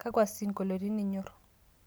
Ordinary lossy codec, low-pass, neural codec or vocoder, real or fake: none; none; none; real